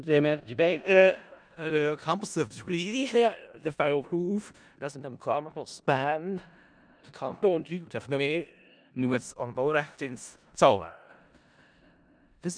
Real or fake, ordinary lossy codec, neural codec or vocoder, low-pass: fake; none; codec, 16 kHz in and 24 kHz out, 0.4 kbps, LongCat-Audio-Codec, four codebook decoder; 9.9 kHz